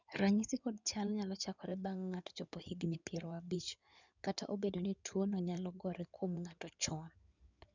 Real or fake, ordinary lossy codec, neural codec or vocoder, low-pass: fake; none; codec, 16 kHz in and 24 kHz out, 2.2 kbps, FireRedTTS-2 codec; 7.2 kHz